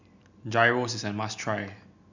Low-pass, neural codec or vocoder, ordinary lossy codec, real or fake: 7.2 kHz; none; none; real